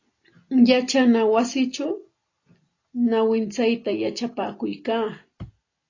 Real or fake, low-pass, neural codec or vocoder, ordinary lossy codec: real; 7.2 kHz; none; AAC, 32 kbps